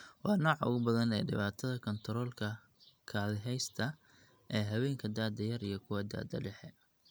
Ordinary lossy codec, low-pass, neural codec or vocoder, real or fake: none; none; none; real